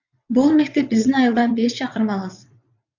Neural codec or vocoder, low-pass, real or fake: vocoder, 22.05 kHz, 80 mel bands, WaveNeXt; 7.2 kHz; fake